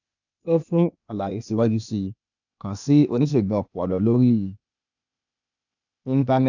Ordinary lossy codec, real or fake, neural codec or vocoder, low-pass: none; fake; codec, 16 kHz, 0.8 kbps, ZipCodec; 7.2 kHz